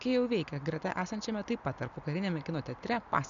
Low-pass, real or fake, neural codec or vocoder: 7.2 kHz; real; none